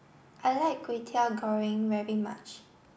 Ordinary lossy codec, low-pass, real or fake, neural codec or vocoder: none; none; real; none